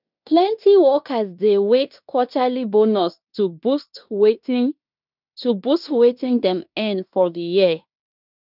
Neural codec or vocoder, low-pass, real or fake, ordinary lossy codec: codec, 16 kHz in and 24 kHz out, 0.9 kbps, LongCat-Audio-Codec, fine tuned four codebook decoder; 5.4 kHz; fake; none